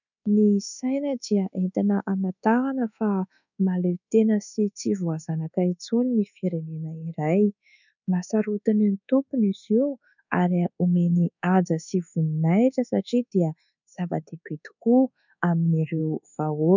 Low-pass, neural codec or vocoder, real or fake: 7.2 kHz; codec, 24 kHz, 1.2 kbps, DualCodec; fake